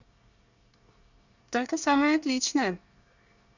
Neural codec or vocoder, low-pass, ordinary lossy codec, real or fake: codec, 24 kHz, 1 kbps, SNAC; 7.2 kHz; none; fake